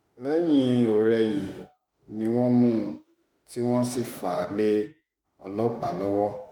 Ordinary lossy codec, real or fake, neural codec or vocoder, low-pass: MP3, 96 kbps; fake; autoencoder, 48 kHz, 32 numbers a frame, DAC-VAE, trained on Japanese speech; 19.8 kHz